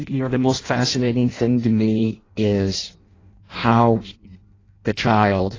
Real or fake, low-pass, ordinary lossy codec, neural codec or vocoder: fake; 7.2 kHz; AAC, 32 kbps; codec, 16 kHz in and 24 kHz out, 0.6 kbps, FireRedTTS-2 codec